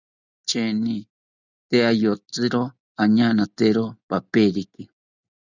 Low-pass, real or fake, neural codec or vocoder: 7.2 kHz; real; none